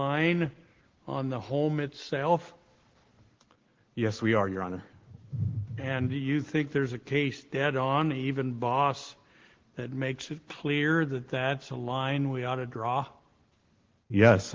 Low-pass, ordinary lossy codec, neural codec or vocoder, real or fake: 7.2 kHz; Opus, 16 kbps; none; real